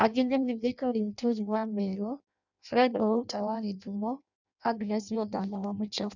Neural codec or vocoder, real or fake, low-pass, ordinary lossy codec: codec, 16 kHz in and 24 kHz out, 0.6 kbps, FireRedTTS-2 codec; fake; 7.2 kHz; none